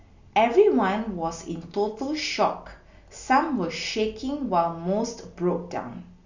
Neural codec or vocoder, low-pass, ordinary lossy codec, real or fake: none; 7.2 kHz; Opus, 64 kbps; real